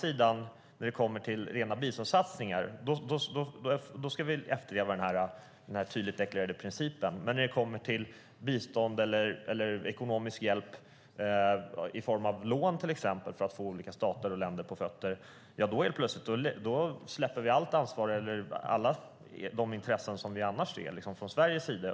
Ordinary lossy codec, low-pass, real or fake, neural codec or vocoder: none; none; real; none